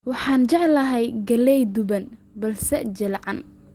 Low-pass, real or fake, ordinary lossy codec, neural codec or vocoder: 19.8 kHz; real; Opus, 16 kbps; none